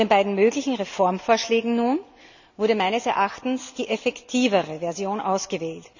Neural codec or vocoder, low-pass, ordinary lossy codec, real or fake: none; 7.2 kHz; none; real